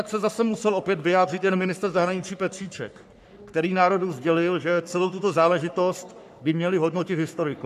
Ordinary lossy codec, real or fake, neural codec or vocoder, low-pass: AAC, 96 kbps; fake; codec, 44.1 kHz, 3.4 kbps, Pupu-Codec; 14.4 kHz